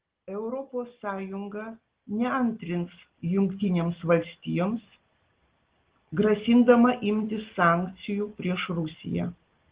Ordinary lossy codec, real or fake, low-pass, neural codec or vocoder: Opus, 16 kbps; real; 3.6 kHz; none